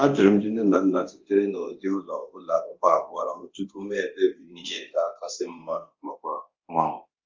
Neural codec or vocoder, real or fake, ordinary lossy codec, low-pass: codec, 24 kHz, 0.9 kbps, DualCodec; fake; Opus, 32 kbps; 7.2 kHz